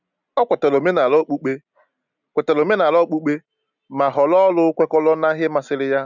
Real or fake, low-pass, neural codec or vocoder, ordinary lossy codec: real; 7.2 kHz; none; none